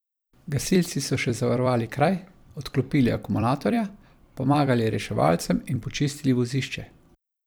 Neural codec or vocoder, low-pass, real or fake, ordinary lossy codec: vocoder, 44.1 kHz, 128 mel bands every 256 samples, BigVGAN v2; none; fake; none